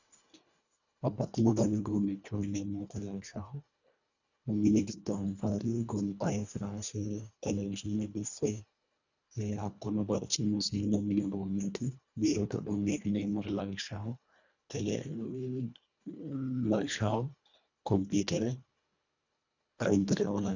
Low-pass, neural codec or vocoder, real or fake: 7.2 kHz; codec, 24 kHz, 1.5 kbps, HILCodec; fake